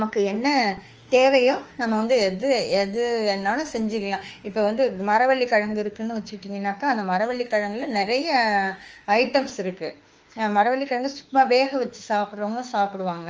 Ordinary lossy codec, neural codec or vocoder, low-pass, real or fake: Opus, 24 kbps; autoencoder, 48 kHz, 32 numbers a frame, DAC-VAE, trained on Japanese speech; 7.2 kHz; fake